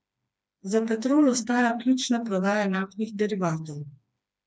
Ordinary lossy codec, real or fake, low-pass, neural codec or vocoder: none; fake; none; codec, 16 kHz, 2 kbps, FreqCodec, smaller model